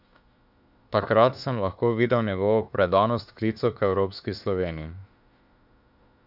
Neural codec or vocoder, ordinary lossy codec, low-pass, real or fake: autoencoder, 48 kHz, 32 numbers a frame, DAC-VAE, trained on Japanese speech; none; 5.4 kHz; fake